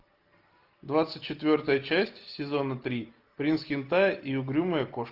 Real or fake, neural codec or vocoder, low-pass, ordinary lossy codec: real; none; 5.4 kHz; Opus, 32 kbps